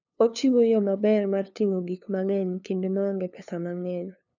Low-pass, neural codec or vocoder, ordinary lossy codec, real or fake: 7.2 kHz; codec, 16 kHz, 2 kbps, FunCodec, trained on LibriTTS, 25 frames a second; none; fake